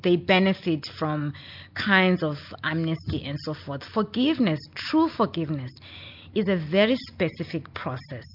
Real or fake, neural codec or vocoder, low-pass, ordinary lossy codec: real; none; 5.4 kHz; MP3, 48 kbps